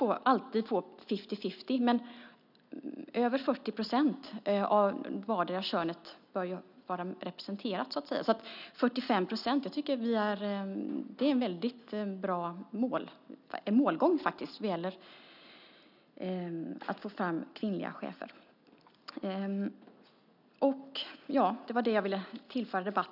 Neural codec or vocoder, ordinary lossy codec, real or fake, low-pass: none; none; real; 5.4 kHz